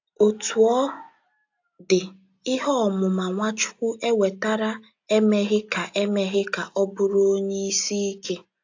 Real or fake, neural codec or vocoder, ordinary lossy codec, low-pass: real; none; AAC, 48 kbps; 7.2 kHz